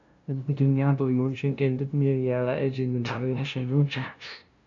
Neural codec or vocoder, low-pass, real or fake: codec, 16 kHz, 0.5 kbps, FunCodec, trained on LibriTTS, 25 frames a second; 7.2 kHz; fake